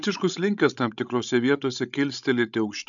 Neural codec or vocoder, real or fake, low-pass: codec, 16 kHz, 16 kbps, FreqCodec, larger model; fake; 7.2 kHz